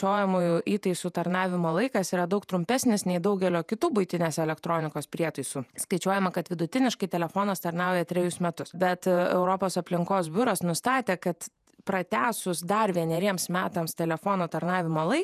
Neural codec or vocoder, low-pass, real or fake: vocoder, 48 kHz, 128 mel bands, Vocos; 14.4 kHz; fake